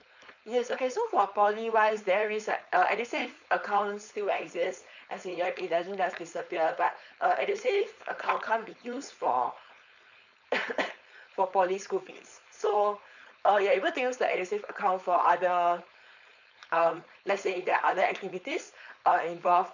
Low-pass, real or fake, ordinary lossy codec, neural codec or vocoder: 7.2 kHz; fake; none; codec, 16 kHz, 4.8 kbps, FACodec